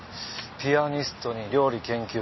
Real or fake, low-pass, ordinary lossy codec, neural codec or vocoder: real; 7.2 kHz; MP3, 24 kbps; none